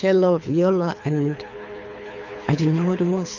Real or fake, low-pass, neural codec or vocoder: fake; 7.2 kHz; codec, 24 kHz, 6 kbps, HILCodec